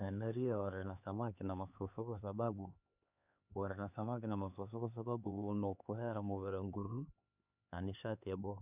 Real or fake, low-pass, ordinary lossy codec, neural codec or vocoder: fake; 3.6 kHz; none; codec, 16 kHz, 4 kbps, X-Codec, HuBERT features, trained on LibriSpeech